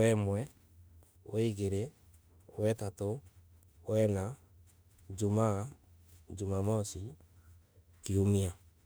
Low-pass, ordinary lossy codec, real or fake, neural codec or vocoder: none; none; fake; autoencoder, 48 kHz, 32 numbers a frame, DAC-VAE, trained on Japanese speech